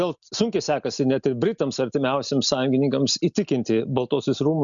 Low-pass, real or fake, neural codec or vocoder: 7.2 kHz; real; none